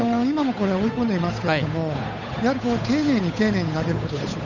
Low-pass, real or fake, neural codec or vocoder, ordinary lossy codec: 7.2 kHz; fake; codec, 16 kHz, 8 kbps, FunCodec, trained on Chinese and English, 25 frames a second; none